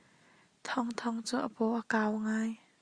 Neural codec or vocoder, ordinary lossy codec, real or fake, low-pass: none; Opus, 64 kbps; real; 9.9 kHz